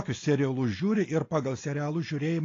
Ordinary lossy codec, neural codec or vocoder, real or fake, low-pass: AAC, 32 kbps; none; real; 7.2 kHz